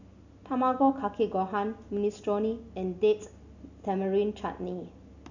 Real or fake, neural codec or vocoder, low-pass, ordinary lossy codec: real; none; 7.2 kHz; none